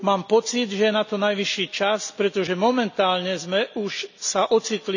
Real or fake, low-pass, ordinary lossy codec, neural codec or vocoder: real; 7.2 kHz; none; none